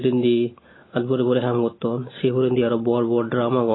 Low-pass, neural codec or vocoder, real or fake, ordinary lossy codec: 7.2 kHz; none; real; AAC, 16 kbps